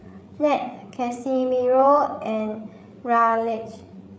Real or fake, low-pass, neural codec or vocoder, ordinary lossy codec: fake; none; codec, 16 kHz, 8 kbps, FreqCodec, larger model; none